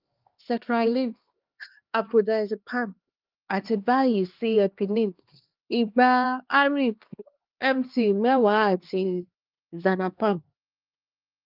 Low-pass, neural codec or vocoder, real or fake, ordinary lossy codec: 5.4 kHz; codec, 16 kHz, 2 kbps, X-Codec, HuBERT features, trained on LibriSpeech; fake; Opus, 32 kbps